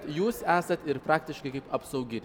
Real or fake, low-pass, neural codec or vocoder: real; 19.8 kHz; none